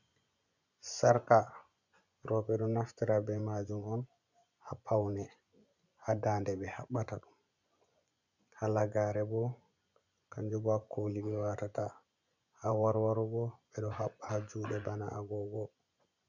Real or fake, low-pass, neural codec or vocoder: fake; 7.2 kHz; vocoder, 24 kHz, 100 mel bands, Vocos